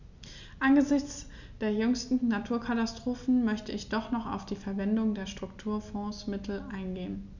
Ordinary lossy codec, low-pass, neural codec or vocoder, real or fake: none; 7.2 kHz; none; real